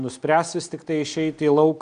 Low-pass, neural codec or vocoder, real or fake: 9.9 kHz; none; real